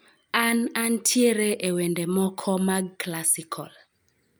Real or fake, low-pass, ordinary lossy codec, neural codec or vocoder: real; none; none; none